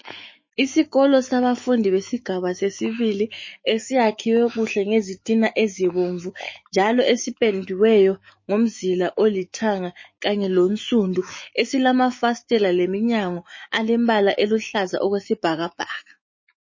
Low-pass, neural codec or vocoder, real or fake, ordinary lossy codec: 7.2 kHz; autoencoder, 48 kHz, 128 numbers a frame, DAC-VAE, trained on Japanese speech; fake; MP3, 32 kbps